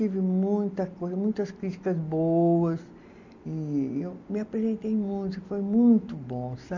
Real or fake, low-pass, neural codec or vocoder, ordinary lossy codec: real; 7.2 kHz; none; none